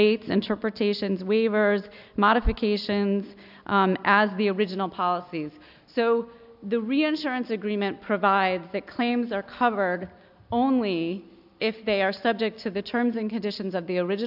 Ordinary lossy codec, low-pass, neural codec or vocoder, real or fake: AAC, 48 kbps; 5.4 kHz; none; real